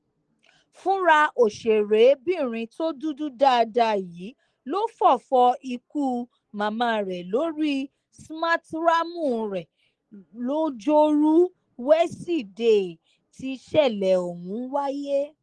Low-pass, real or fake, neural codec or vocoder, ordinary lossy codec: 9.9 kHz; real; none; Opus, 16 kbps